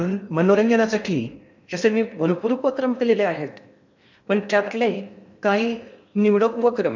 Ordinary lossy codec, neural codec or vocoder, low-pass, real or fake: none; codec, 16 kHz in and 24 kHz out, 0.8 kbps, FocalCodec, streaming, 65536 codes; 7.2 kHz; fake